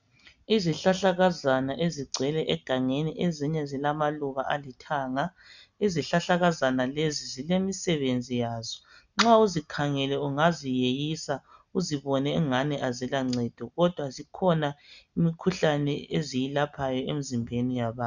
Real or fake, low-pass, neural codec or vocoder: real; 7.2 kHz; none